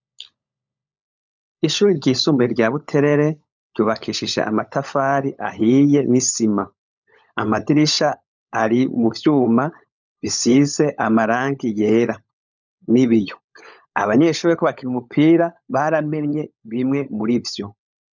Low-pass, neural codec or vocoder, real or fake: 7.2 kHz; codec, 16 kHz, 16 kbps, FunCodec, trained on LibriTTS, 50 frames a second; fake